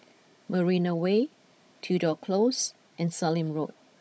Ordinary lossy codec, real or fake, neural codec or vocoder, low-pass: none; fake; codec, 16 kHz, 16 kbps, FunCodec, trained on Chinese and English, 50 frames a second; none